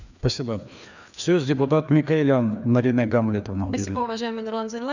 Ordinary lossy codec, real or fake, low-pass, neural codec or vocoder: none; fake; 7.2 kHz; codec, 16 kHz, 2 kbps, FreqCodec, larger model